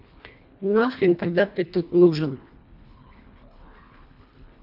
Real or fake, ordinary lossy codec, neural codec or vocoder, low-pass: fake; none; codec, 24 kHz, 1.5 kbps, HILCodec; 5.4 kHz